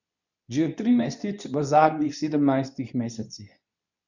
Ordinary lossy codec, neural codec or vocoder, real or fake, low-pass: none; codec, 24 kHz, 0.9 kbps, WavTokenizer, medium speech release version 2; fake; 7.2 kHz